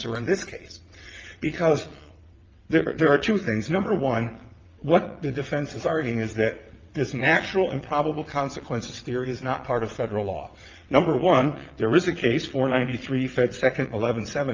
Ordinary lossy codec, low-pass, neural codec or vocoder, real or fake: Opus, 24 kbps; 7.2 kHz; vocoder, 22.05 kHz, 80 mel bands, WaveNeXt; fake